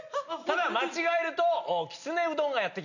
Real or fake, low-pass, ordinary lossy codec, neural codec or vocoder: real; 7.2 kHz; none; none